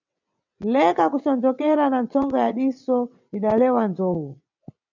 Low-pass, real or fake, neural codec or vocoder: 7.2 kHz; fake; vocoder, 22.05 kHz, 80 mel bands, WaveNeXt